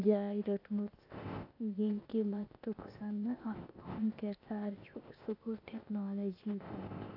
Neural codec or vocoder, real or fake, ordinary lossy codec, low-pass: codec, 16 kHz, 0.8 kbps, ZipCodec; fake; AAC, 48 kbps; 5.4 kHz